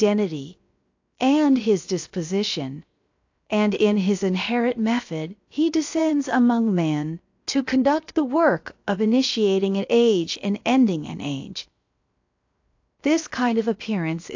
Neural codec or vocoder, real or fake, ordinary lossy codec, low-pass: codec, 16 kHz, 0.7 kbps, FocalCodec; fake; AAC, 48 kbps; 7.2 kHz